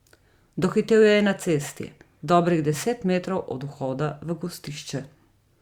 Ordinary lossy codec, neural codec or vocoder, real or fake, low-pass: Opus, 64 kbps; vocoder, 44.1 kHz, 128 mel bands every 512 samples, BigVGAN v2; fake; 19.8 kHz